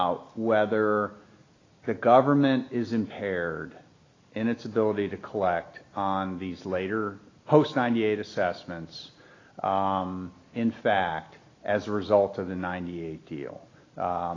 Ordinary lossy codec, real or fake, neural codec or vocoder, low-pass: AAC, 32 kbps; real; none; 7.2 kHz